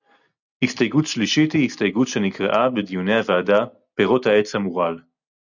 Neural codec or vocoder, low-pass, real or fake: none; 7.2 kHz; real